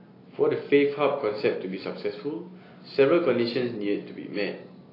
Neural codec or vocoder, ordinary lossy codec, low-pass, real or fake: none; AAC, 24 kbps; 5.4 kHz; real